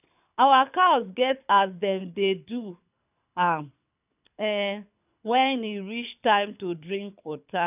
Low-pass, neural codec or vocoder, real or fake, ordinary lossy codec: 3.6 kHz; codec, 24 kHz, 6 kbps, HILCodec; fake; none